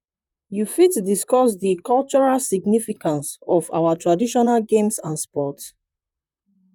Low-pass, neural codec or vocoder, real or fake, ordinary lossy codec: 19.8 kHz; vocoder, 44.1 kHz, 128 mel bands, Pupu-Vocoder; fake; none